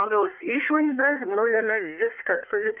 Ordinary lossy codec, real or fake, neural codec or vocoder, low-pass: Opus, 24 kbps; fake; codec, 24 kHz, 1 kbps, SNAC; 3.6 kHz